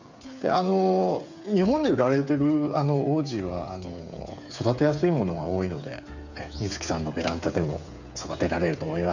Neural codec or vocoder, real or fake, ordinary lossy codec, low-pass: codec, 16 kHz, 8 kbps, FreqCodec, smaller model; fake; none; 7.2 kHz